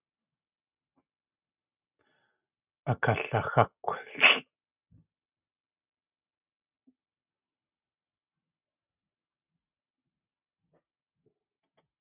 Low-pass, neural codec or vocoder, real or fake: 3.6 kHz; none; real